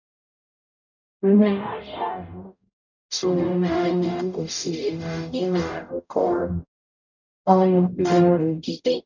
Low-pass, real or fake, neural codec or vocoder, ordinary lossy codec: 7.2 kHz; fake; codec, 44.1 kHz, 0.9 kbps, DAC; none